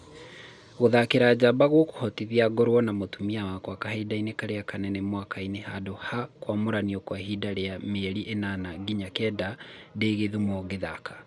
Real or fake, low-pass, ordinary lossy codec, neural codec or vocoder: real; none; none; none